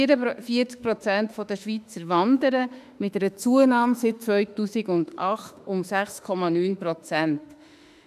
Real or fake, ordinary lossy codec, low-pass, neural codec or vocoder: fake; none; 14.4 kHz; autoencoder, 48 kHz, 32 numbers a frame, DAC-VAE, trained on Japanese speech